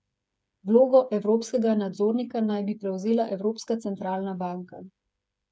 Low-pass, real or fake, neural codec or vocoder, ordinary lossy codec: none; fake; codec, 16 kHz, 8 kbps, FreqCodec, smaller model; none